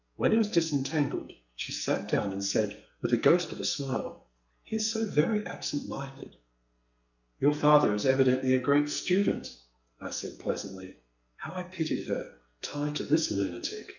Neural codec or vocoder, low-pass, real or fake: codec, 44.1 kHz, 2.6 kbps, SNAC; 7.2 kHz; fake